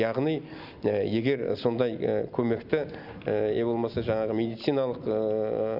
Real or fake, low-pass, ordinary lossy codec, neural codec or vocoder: real; 5.4 kHz; none; none